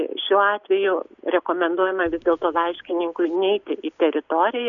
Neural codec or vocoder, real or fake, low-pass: none; real; 7.2 kHz